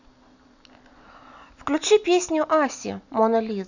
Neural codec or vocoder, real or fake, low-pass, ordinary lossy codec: none; real; 7.2 kHz; none